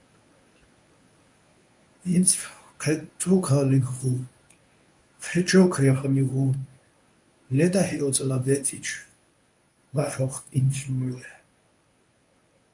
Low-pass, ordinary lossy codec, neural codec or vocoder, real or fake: 10.8 kHz; MP3, 96 kbps; codec, 24 kHz, 0.9 kbps, WavTokenizer, medium speech release version 1; fake